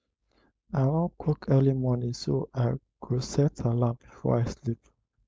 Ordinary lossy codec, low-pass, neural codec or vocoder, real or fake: none; none; codec, 16 kHz, 4.8 kbps, FACodec; fake